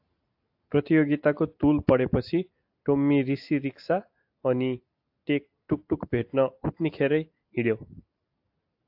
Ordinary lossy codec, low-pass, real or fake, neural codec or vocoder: AAC, 48 kbps; 5.4 kHz; real; none